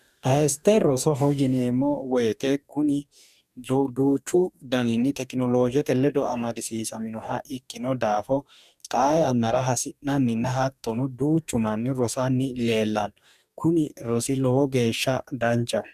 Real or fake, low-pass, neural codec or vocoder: fake; 14.4 kHz; codec, 44.1 kHz, 2.6 kbps, DAC